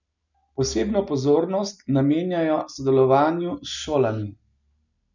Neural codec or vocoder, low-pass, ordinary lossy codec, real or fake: none; 7.2 kHz; none; real